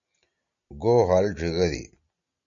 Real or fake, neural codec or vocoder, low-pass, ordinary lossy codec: real; none; 7.2 kHz; MP3, 96 kbps